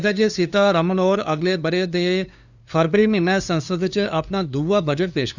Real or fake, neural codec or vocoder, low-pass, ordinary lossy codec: fake; codec, 16 kHz, 2 kbps, FunCodec, trained on LibriTTS, 25 frames a second; 7.2 kHz; none